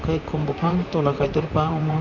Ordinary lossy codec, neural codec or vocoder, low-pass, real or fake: none; vocoder, 44.1 kHz, 128 mel bands, Pupu-Vocoder; 7.2 kHz; fake